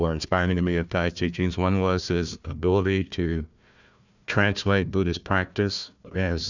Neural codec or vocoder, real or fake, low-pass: codec, 16 kHz, 1 kbps, FunCodec, trained on Chinese and English, 50 frames a second; fake; 7.2 kHz